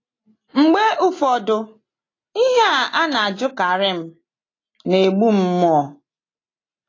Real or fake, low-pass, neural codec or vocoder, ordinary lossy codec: real; 7.2 kHz; none; AAC, 32 kbps